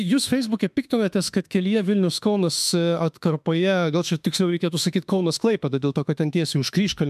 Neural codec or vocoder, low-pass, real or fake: autoencoder, 48 kHz, 32 numbers a frame, DAC-VAE, trained on Japanese speech; 14.4 kHz; fake